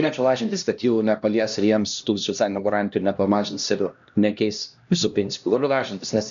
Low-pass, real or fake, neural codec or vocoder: 7.2 kHz; fake; codec, 16 kHz, 1 kbps, X-Codec, HuBERT features, trained on LibriSpeech